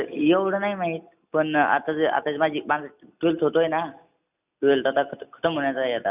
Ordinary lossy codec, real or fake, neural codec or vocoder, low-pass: none; real; none; 3.6 kHz